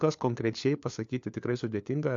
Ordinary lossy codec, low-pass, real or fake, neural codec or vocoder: AAC, 64 kbps; 7.2 kHz; fake; codec, 16 kHz, 4 kbps, FunCodec, trained on LibriTTS, 50 frames a second